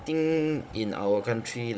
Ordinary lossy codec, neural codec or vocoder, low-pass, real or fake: none; codec, 16 kHz, 16 kbps, FunCodec, trained on Chinese and English, 50 frames a second; none; fake